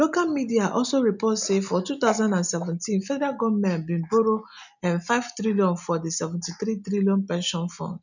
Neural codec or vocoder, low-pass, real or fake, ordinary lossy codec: none; 7.2 kHz; real; none